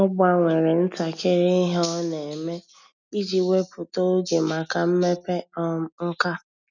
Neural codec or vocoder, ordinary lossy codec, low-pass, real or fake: none; none; 7.2 kHz; real